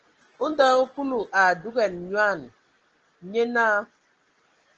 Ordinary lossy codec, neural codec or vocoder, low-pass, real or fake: Opus, 16 kbps; none; 7.2 kHz; real